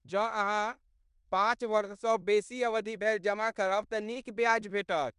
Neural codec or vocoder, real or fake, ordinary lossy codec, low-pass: codec, 16 kHz in and 24 kHz out, 0.9 kbps, LongCat-Audio-Codec, fine tuned four codebook decoder; fake; MP3, 96 kbps; 10.8 kHz